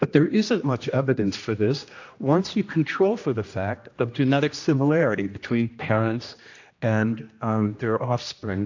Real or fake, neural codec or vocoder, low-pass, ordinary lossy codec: fake; codec, 16 kHz, 1 kbps, X-Codec, HuBERT features, trained on general audio; 7.2 kHz; AAC, 48 kbps